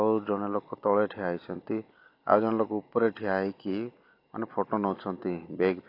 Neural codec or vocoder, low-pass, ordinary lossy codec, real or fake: none; 5.4 kHz; AAC, 32 kbps; real